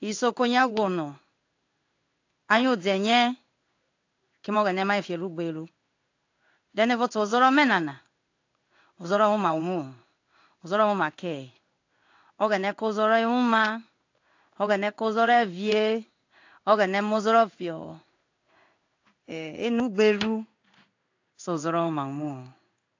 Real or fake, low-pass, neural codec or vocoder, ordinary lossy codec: fake; 7.2 kHz; codec, 16 kHz in and 24 kHz out, 1 kbps, XY-Tokenizer; AAC, 48 kbps